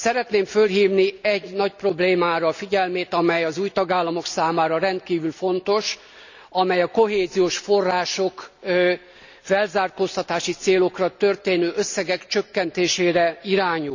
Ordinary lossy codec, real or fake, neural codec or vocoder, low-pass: none; real; none; 7.2 kHz